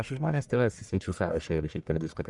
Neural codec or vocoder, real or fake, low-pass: codec, 44.1 kHz, 1.7 kbps, Pupu-Codec; fake; 10.8 kHz